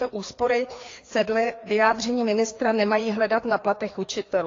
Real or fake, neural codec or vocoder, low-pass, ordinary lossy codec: fake; codec, 16 kHz, 2 kbps, FreqCodec, larger model; 7.2 kHz; AAC, 32 kbps